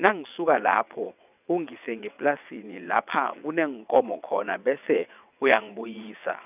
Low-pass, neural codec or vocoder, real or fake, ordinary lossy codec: 3.6 kHz; vocoder, 22.05 kHz, 80 mel bands, WaveNeXt; fake; none